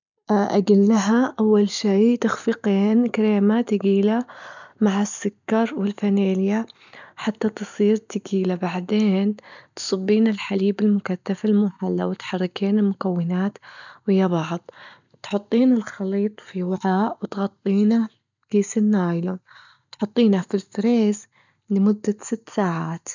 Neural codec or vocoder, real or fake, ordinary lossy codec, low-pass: none; real; none; 7.2 kHz